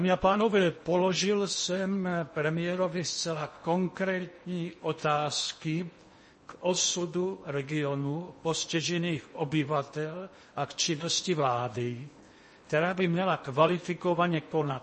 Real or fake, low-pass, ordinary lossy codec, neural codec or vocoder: fake; 10.8 kHz; MP3, 32 kbps; codec, 16 kHz in and 24 kHz out, 0.8 kbps, FocalCodec, streaming, 65536 codes